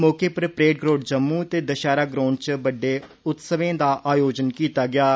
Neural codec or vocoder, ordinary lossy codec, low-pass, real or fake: none; none; none; real